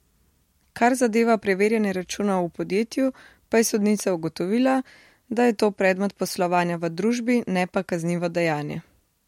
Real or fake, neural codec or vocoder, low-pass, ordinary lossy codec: real; none; 19.8 kHz; MP3, 64 kbps